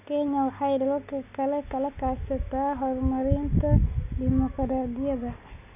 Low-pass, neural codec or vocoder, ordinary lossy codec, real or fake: 3.6 kHz; none; none; real